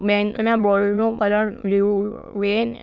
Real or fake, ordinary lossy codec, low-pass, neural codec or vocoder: fake; none; 7.2 kHz; autoencoder, 22.05 kHz, a latent of 192 numbers a frame, VITS, trained on many speakers